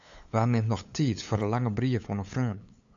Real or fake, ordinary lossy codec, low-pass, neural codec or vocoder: fake; AAC, 64 kbps; 7.2 kHz; codec, 16 kHz, 8 kbps, FunCodec, trained on LibriTTS, 25 frames a second